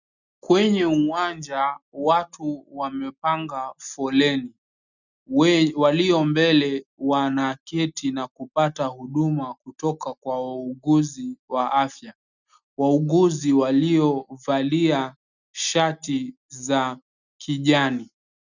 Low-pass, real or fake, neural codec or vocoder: 7.2 kHz; real; none